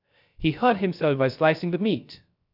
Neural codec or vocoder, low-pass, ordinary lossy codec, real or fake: codec, 16 kHz, 0.3 kbps, FocalCodec; 5.4 kHz; none; fake